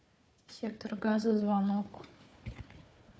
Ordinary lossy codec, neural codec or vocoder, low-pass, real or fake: none; codec, 16 kHz, 4 kbps, FunCodec, trained on Chinese and English, 50 frames a second; none; fake